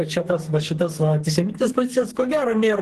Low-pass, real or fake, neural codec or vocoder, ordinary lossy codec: 14.4 kHz; fake; codec, 32 kHz, 1.9 kbps, SNAC; Opus, 16 kbps